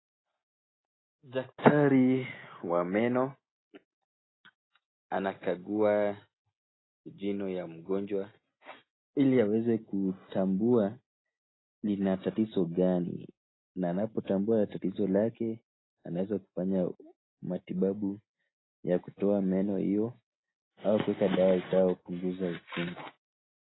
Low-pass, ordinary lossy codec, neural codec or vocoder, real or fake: 7.2 kHz; AAC, 16 kbps; none; real